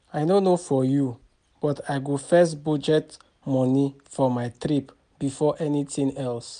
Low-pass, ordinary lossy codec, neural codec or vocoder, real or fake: 9.9 kHz; none; none; real